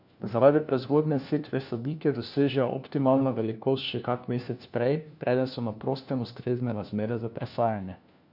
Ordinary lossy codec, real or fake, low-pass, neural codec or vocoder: none; fake; 5.4 kHz; codec, 16 kHz, 1 kbps, FunCodec, trained on LibriTTS, 50 frames a second